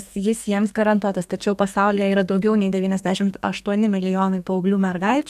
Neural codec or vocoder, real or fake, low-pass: codec, 32 kHz, 1.9 kbps, SNAC; fake; 14.4 kHz